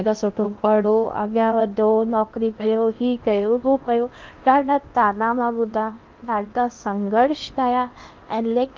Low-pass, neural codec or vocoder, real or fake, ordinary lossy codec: 7.2 kHz; codec, 16 kHz in and 24 kHz out, 0.8 kbps, FocalCodec, streaming, 65536 codes; fake; Opus, 32 kbps